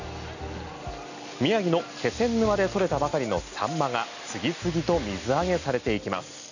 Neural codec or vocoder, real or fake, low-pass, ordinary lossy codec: none; real; 7.2 kHz; none